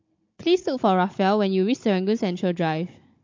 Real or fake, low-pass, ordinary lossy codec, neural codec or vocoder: fake; 7.2 kHz; MP3, 48 kbps; codec, 16 kHz, 16 kbps, FunCodec, trained on Chinese and English, 50 frames a second